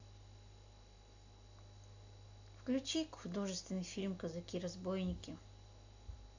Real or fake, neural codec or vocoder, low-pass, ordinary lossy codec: real; none; 7.2 kHz; AAC, 48 kbps